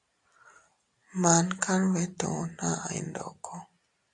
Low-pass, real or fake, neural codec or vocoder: 10.8 kHz; real; none